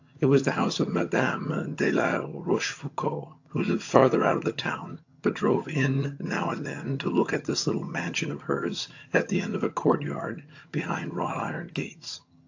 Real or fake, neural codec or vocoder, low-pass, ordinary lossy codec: fake; vocoder, 22.05 kHz, 80 mel bands, HiFi-GAN; 7.2 kHz; AAC, 48 kbps